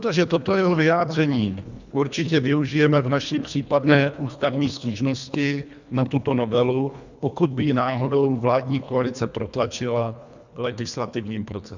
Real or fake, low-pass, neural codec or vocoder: fake; 7.2 kHz; codec, 24 kHz, 1.5 kbps, HILCodec